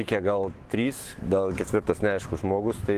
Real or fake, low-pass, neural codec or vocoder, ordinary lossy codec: fake; 14.4 kHz; autoencoder, 48 kHz, 128 numbers a frame, DAC-VAE, trained on Japanese speech; Opus, 16 kbps